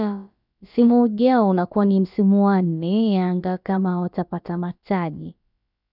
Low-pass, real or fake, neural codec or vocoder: 5.4 kHz; fake; codec, 16 kHz, about 1 kbps, DyCAST, with the encoder's durations